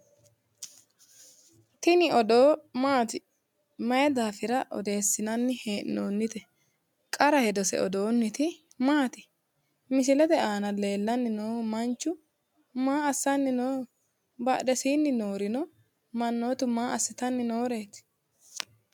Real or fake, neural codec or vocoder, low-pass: real; none; 19.8 kHz